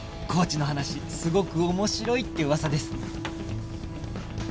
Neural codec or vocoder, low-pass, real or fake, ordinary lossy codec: none; none; real; none